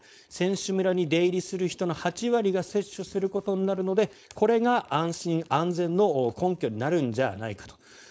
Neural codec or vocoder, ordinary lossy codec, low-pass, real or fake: codec, 16 kHz, 4.8 kbps, FACodec; none; none; fake